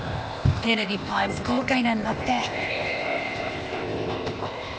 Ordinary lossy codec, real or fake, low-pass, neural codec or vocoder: none; fake; none; codec, 16 kHz, 0.8 kbps, ZipCodec